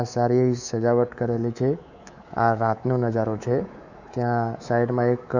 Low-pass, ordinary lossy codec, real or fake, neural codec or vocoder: 7.2 kHz; none; fake; codec, 24 kHz, 3.1 kbps, DualCodec